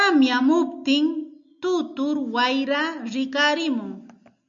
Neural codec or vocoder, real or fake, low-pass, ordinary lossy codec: none; real; 7.2 kHz; MP3, 64 kbps